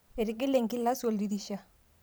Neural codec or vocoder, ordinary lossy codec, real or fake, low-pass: vocoder, 44.1 kHz, 128 mel bands every 512 samples, BigVGAN v2; none; fake; none